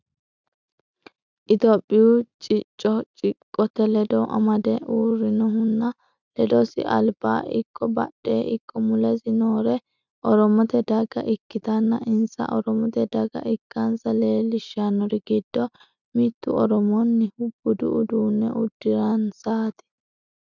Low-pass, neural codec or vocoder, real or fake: 7.2 kHz; none; real